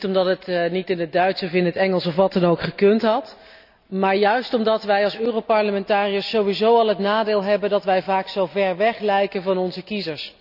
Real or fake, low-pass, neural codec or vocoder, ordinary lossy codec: real; 5.4 kHz; none; none